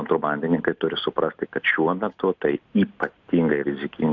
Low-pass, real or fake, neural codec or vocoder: 7.2 kHz; real; none